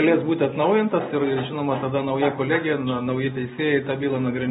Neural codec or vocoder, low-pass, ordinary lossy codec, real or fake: none; 19.8 kHz; AAC, 16 kbps; real